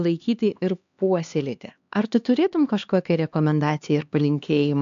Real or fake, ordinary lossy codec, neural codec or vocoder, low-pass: fake; AAC, 64 kbps; codec, 16 kHz, 2 kbps, X-Codec, HuBERT features, trained on LibriSpeech; 7.2 kHz